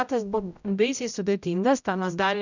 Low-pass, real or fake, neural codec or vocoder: 7.2 kHz; fake; codec, 16 kHz, 0.5 kbps, X-Codec, HuBERT features, trained on general audio